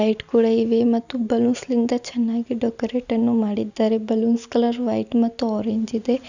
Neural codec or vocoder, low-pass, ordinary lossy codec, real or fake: none; 7.2 kHz; none; real